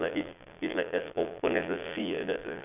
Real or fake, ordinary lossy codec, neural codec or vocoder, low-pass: fake; none; vocoder, 22.05 kHz, 80 mel bands, Vocos; 3.6 kHz